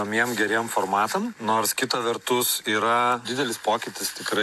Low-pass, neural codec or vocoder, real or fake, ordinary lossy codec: 14.4 kHz; none; real; AAC, 96 kbps